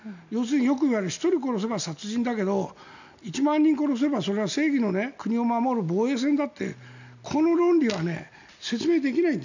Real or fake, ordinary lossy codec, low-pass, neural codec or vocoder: real; none; 7.2 kHz; none